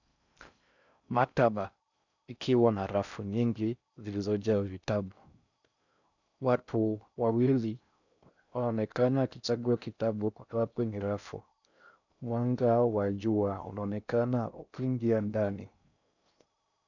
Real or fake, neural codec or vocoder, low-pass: fake; codec, 16 kHz in and 24 kHz out, 0.6 kbps, FocalCodec, streaming, 4096 codes; 7.2 kHz